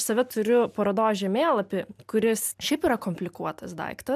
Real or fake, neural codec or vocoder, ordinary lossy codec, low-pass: real; none; AAC, 96 kbps; 14.4 kHz